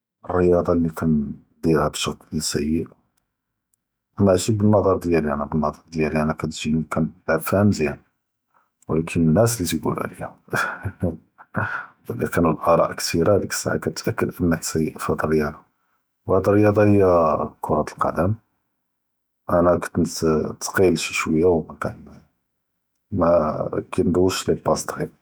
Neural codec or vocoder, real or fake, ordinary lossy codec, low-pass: autoencoder, 48 kHz, 128 numbers a frame, DAC-VAE, trained on Japanese speech; fake; none; none